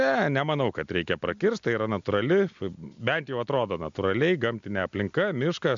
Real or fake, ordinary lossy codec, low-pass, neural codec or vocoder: real; MP3, 64 kbps; 7.2 kHz; none